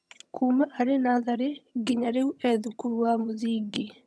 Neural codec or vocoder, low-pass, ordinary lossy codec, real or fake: vocoder, 22.05 kHz, 80 mel bands, HiFi-GAN; none; none; fake